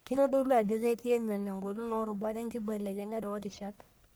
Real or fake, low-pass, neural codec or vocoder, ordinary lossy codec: fake; none; codec, 44.1 kHz, 1.7 kbps, Pupu-Codec; none